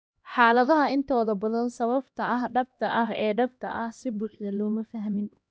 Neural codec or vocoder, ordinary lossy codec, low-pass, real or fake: codec, 16 kHz, 1 kbps, X-Codec, HuBERT features, trained on LibriSpeech; none; none; fake